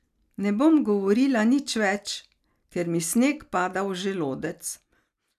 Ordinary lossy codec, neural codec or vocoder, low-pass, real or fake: none; none; 14.4 kHz; real